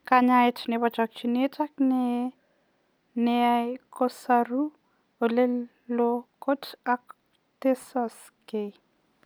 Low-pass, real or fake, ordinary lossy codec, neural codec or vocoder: none; real; none; none